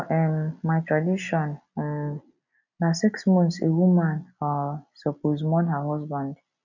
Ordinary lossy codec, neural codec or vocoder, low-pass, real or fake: none; none; 7.2 kHz; real